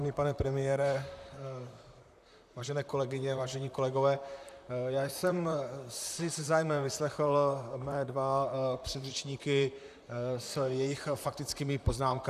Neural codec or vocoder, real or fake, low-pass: vocoder, 44.1 kHz, 128 mel bands, Pupu-Vocoder; fake; 14.4 kHz